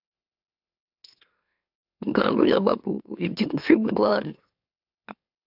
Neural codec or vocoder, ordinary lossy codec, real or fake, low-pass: autoencoder, 44.1 kHz, a latent of 192 numbers a frame, MeloTTS; AAC, 48 kbps; fake; 5.4 kHz